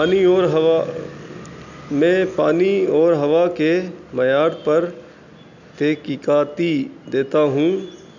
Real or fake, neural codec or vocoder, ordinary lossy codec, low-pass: real; none; none; 7.2 kHz